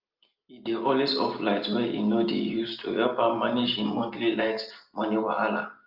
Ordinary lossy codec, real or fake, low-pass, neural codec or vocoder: Opus, 24 kbps; real; 5.4 kHz; none